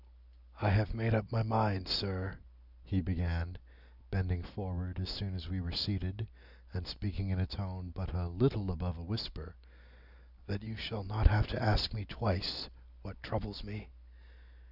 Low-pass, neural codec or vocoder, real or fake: 5.4 kHz; none; real